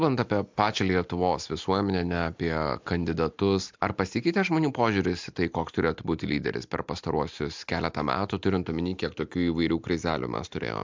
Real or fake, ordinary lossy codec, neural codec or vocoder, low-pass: real; MP3, 64 kbps; none; 7.2 kHz